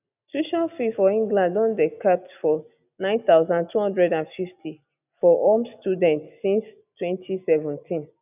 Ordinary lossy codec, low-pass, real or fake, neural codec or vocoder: none; 3.6 kHz; real; none